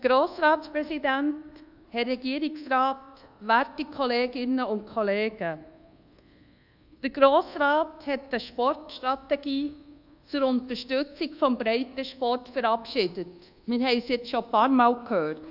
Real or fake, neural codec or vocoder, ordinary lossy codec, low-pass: fake; codec, 24 kHz, 1.2 kbps, DualCodec; AAC, 48 kbps; 5.4 kHz